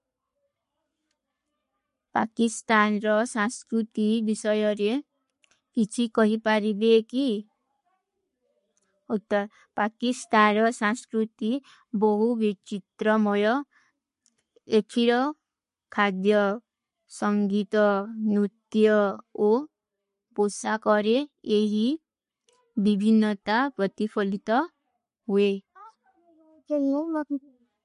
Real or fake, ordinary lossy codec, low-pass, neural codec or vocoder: fake; MP3, 48 kbps; 14.4 kHz; codec, 44.1 kHz, 7.8 kbps, Pupu-Codec